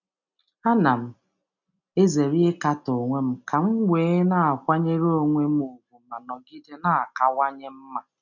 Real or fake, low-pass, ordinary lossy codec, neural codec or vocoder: real; 7.2 kHz; none; none